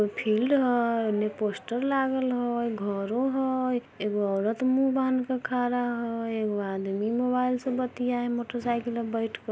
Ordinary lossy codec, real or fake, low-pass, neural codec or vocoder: none; real; none; none